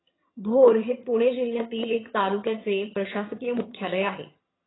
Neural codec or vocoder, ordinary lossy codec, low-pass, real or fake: vocoder, 22.05 kHz, 80 mel bands, HiFi-GAN; AAC, 16 kbps; 7.2 kHz; fake